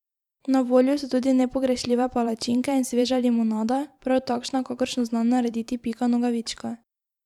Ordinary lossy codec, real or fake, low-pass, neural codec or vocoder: none; fake; 19.8 kHz; vocoder, 44.1 kHz, 128 mel bands every 512 samples, BigVGAN v2